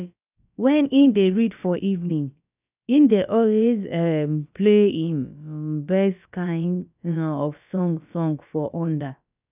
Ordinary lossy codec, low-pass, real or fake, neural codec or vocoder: none; 3.6 kHz; fake; codec, 16 kHz, about 1 kbps, DyCAST, with the encoder's durations